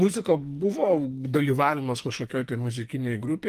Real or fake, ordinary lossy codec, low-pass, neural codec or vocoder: fake; Opus, 32 kbps; 14.4 kHz; codec, 44.1 kHz, 2.6 kbps, SNAC